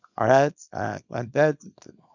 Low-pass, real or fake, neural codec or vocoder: 7.2 kHz; fake; codec, 24 kHz, 0.9 kbps, WavTokenizer, small release